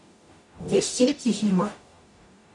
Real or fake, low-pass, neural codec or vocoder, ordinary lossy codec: fake; 10.8 kHz; codec, 44.1 kHz, 0.9 kbps, DAC; none